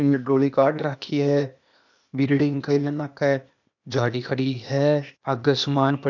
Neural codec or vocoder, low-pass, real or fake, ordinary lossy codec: codec, 16 kHz, 0.8 kbps, ZipCodec; 7.2 kHz; fake; none